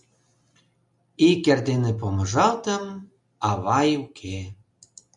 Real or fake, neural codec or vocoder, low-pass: real; none; 10.8 kHz